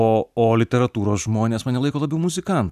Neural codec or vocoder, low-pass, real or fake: none; 14.4 kHz; real